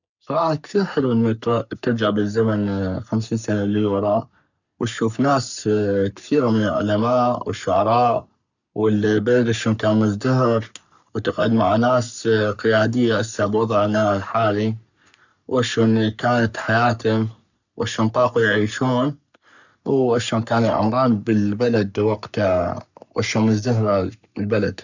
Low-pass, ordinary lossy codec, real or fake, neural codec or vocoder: 7.2 kHz; none; fake; codec, 44.1 kHz, 3.4 kbps, Pupu-Codec